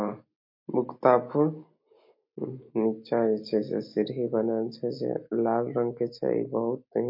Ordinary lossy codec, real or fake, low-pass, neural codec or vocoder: MP3, 32 kbps; real; 5.4 kHz; none